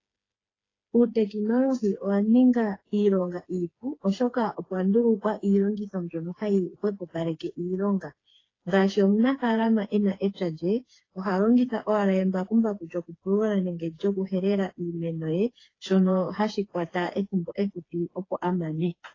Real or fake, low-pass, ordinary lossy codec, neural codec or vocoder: fake; 7.2 kHz; AAC, 32 kbps; codec, 16 kHz, 4 kbps, FreqCodec, smaller model